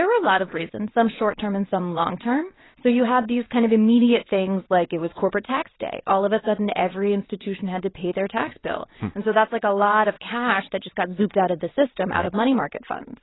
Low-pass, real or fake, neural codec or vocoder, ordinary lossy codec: 7.2 kHz; fake; vocoder, 22.05 kHz, 80 mel bands, WaveNeXt; AAC, 16 kbps